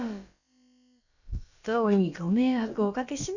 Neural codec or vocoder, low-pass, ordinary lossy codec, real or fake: codec, 16 kHz, about 1 kbps, DyCAST, with the encoder's durations; 7.2 kHz; none; fake